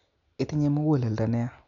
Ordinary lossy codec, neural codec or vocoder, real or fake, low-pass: none; none; real; 7.2 kHz